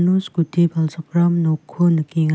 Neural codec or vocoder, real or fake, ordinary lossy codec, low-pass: none; real; none; none